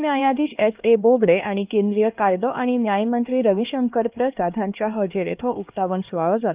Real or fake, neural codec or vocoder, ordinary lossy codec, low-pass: fake; codec, 16 kHz, 2 kbps, X-Codec, HuBERT features, trained on LibriSpeech; Opus, 32 kbps; 3.6 kHz